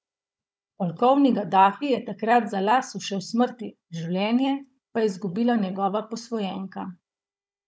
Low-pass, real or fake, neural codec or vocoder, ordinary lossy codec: none; fake; codec, 16 kHz, 16 kbps, FunCodec, trained on Chinese and English, 50 frames a second; none